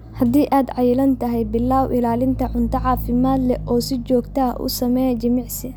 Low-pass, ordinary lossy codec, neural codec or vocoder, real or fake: none; none; none; real